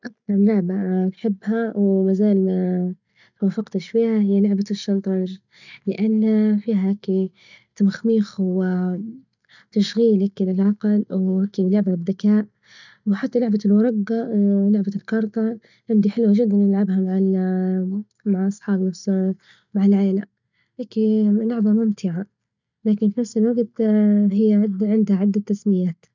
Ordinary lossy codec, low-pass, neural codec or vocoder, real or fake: none; 7.2 kHz; none; real